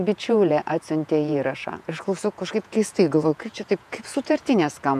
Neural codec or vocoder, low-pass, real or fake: vocoder, 48 kHz, 128 mel bands, Vocos; 14.4 kHz; fake